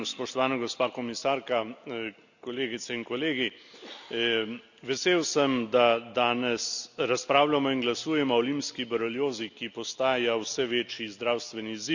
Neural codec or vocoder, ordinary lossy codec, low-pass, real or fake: none; none; 7.2 kHz; real